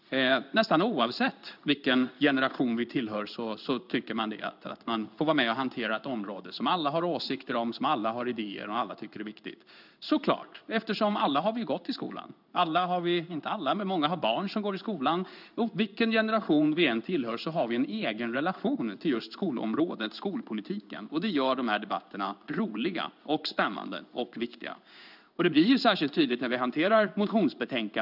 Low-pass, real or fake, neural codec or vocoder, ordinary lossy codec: 5.4 kHz; fake; codec, 16 kHz in and 24 kHz out, 1 kbps, XY-Tokenizer; none